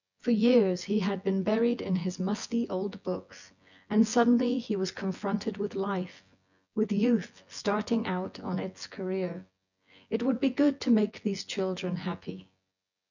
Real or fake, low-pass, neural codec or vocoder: fake; 7.2 kHz; vocoder, 24 kHz, 100 mel bands, Vocos